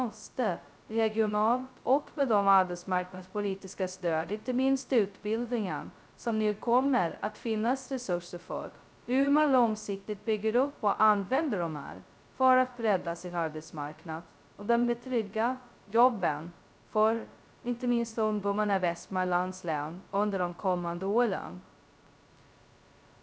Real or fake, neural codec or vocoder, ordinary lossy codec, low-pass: fake; codec, 16 kHz, 0.2 kbps, FocalCodec; none; none